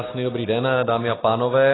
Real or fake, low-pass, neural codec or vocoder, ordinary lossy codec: real; 7.2 kHz; none; AAC, 16 kbps